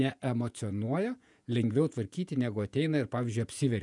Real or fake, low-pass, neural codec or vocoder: fake; 10.8 kHz; vocoder, 48 kHz, 128 mel bands, Vocos